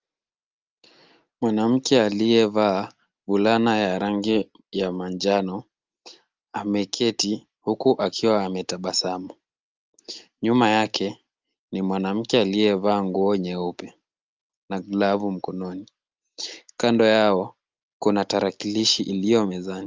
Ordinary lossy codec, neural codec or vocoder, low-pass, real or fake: Opus, 24 kbps; none; 7.2 kHz; real